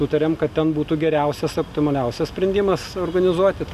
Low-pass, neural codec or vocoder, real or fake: 14.4 kHz; none; real